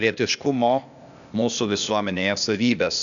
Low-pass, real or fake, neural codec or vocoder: 7.2 kHz; fake; codec, 16 kHz, 0.8 kbps, ZipCodec